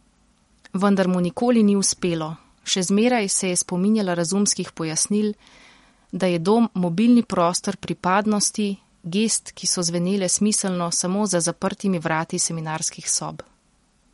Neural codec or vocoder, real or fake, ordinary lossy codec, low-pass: vocoder, 44.1 kHz, 128 mel bands every 256 samples, BigVGAN v2; fake; MP3, 48 kbps; 19.8 kHz